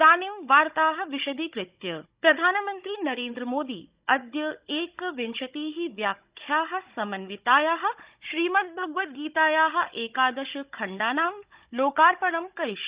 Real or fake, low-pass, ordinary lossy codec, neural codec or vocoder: fake; 3.6 kHz; Opus, 64 kbps; codec, 16 kHz, 16 kbps, FunCodec, trained on Chinese and English, 50 frames a second